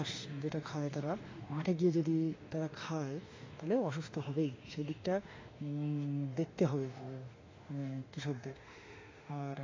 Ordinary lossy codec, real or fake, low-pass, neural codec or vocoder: AAC, 32 kbps; fake; 7.2 kHz; autoencoder, 48 kHz, 32 numbers a frame, DAC-VAE, trained on Japanese speech